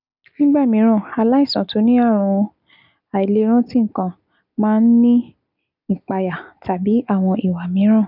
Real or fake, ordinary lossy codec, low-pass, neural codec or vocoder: real; none; 5.4 kHz; none